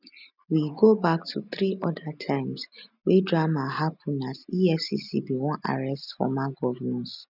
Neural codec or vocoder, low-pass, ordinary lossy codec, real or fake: none; 5.4 kHz; none; real